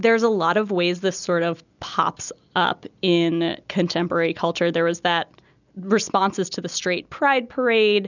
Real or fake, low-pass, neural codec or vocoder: real; 7.2 kHz; none